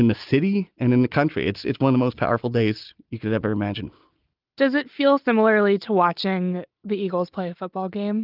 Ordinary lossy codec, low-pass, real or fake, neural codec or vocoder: Opus, 24 kbps; 5.4 kHz; real; none